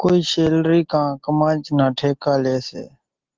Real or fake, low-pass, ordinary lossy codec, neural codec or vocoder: real; 7.2 kHz; Opus, 16 kbps; none